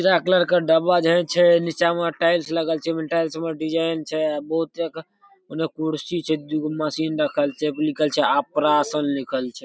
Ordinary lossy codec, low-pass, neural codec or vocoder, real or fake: none; none; none; real